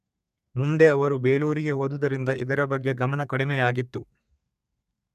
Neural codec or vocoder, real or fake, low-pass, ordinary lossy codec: codec, 32 kHz, 1.9 kbps, SNAC; fake; 14.4 kHz; none